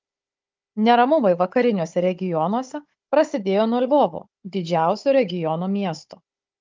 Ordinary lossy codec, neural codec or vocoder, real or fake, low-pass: Opus, 24 kbps; codec, 16 kHz, 16 kbps, FunCodec, trained on Chinese and English, 50 frames a second; fake; 7.2 kHz